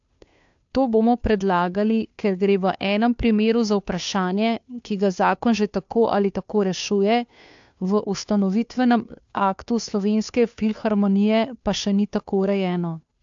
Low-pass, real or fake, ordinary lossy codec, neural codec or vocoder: 7.2 kHz; fake; AAC, 64 kbps; codec, 16 kHz, 2 kbps, FunCodec, trained on Chinese and English, 25 frames a second